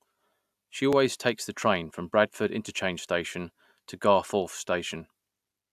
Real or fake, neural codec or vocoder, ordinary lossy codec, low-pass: real; none; AAC, 96 kbps; 14.4 kHz